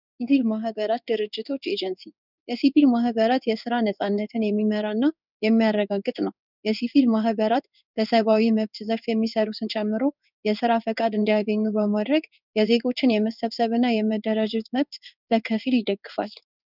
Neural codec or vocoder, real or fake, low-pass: codec, 16 kHz in and 24 kHz out, 1 kbps, XY-Tokenizer; fake; 5.4 kHz